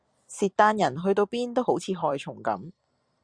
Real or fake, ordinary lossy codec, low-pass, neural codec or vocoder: fake; Opus, 64 kbps; 9.9 kHz; vocoder, 44.1 kHz, 128 mel bands every 256 samples, BigVGAN v2